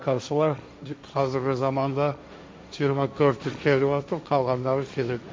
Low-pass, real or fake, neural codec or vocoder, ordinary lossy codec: none; fake; codec, 16 kHz, 1.1 kbps, Voila-Tokenizer; none